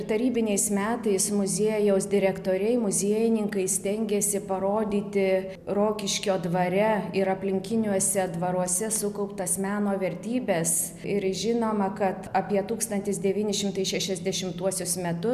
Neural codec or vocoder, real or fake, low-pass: none; real; 14.4 kHz